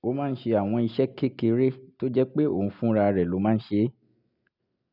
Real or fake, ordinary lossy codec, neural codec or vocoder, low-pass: real; none; none; 5.4 kHz